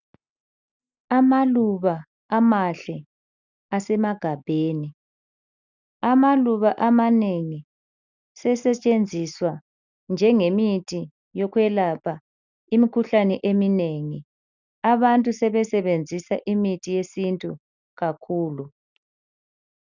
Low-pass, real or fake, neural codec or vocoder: 7.2 kHz; real; none